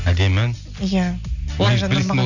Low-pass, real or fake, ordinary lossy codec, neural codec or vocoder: 7.2 kHz; real; none; none